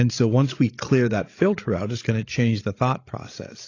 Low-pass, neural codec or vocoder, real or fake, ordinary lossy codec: 7.2 kHz; codec, 16 kHz, 16 kbps, FreqCodec, larger model; fake; AAC, 32 kbps